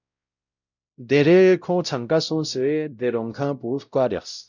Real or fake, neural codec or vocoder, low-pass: fake; codec, 16 kHz, 0.5 kbps, X-Codec, WavLM features, trained on Multilingual LibriSpeech; 7.2 kHz